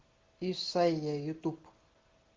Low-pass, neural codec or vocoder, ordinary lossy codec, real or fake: 7.2 kHz; none; Opus, 16 kbps; real